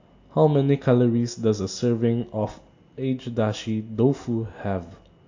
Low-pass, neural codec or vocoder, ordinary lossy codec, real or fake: 7.2 kHz; none; AAC, 48 kbps; real